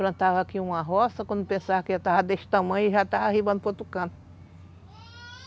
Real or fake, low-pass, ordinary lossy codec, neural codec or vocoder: real; none; none; none